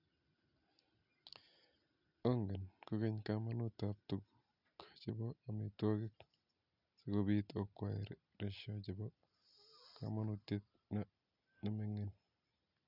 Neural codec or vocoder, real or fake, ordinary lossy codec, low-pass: none; real; none; 5.4 kHz